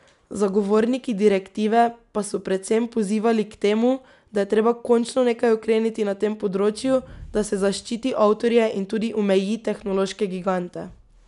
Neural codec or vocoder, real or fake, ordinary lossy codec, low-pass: none; real; none; 10.8 kHz